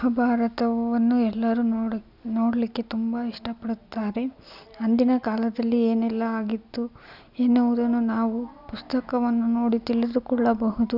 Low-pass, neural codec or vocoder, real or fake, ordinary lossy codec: 5.4 kHz; none; real; none